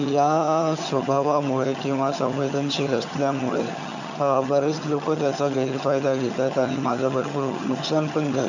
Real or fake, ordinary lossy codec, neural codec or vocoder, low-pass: fake; none; vocoder, 22.05 kHz, 80 mel bands, HiFi-GAN; 7.2 kHz